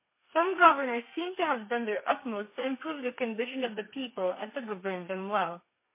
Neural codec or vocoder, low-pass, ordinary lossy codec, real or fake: codec, 32 kHz, 1.9 kbps, SNAC; 3.6 kHz; MP3, 24 kbps; fake